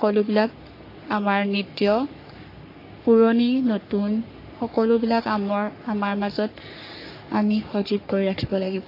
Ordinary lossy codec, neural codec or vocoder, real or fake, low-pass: AAC, 32 kbps; codec, 44.1 kHz, 3.4 kbps, Pupu-Codec; fake; 5.4 kHz